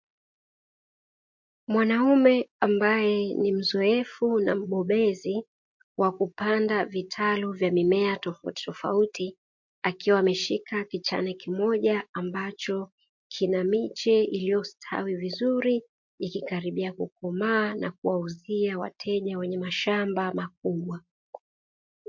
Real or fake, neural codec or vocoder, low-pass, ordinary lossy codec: real; none; 7.2 kHz; MP3, 48 kbps